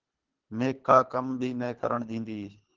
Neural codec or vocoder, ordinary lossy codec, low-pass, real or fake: codec, 24 kHz, 3 kbps, HILCodec; Opus, 16 kbps; 7.2 kHz; fake